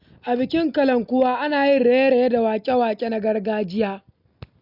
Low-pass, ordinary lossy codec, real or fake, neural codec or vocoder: 5.4 kHz; none; real; none